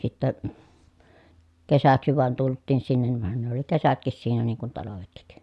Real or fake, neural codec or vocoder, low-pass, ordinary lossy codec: real; none; none; none